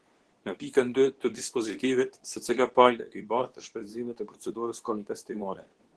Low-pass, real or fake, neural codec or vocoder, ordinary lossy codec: 10.8 kHz; fake; codec, 24 kHz, 0.9 kbps, WavTokenizer, medium speech release version 2; Opus, 16 kbps